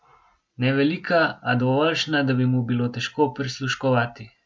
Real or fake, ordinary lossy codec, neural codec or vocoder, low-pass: real; none; none; none